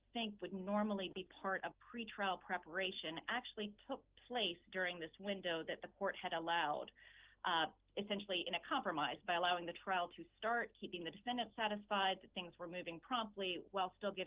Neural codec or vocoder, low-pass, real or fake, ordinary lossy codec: none; 3.6 kHz; real; Opus, 16 kbps